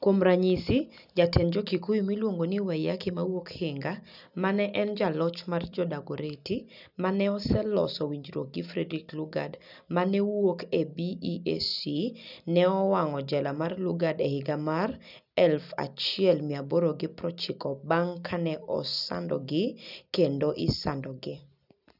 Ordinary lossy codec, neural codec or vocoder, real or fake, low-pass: none; none; real; 5.4 kHz